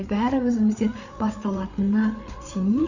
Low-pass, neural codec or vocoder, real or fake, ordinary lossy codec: 7.2 kHz; codec, 16 kHz, 8 kbps, FreqCodec, larger model; fake; none